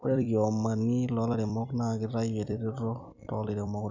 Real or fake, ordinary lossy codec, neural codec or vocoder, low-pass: fake; none; vocoder, 44.1 kHz, 128 mel bands every 256 samples, BigVGAN v2; 7.2 kHz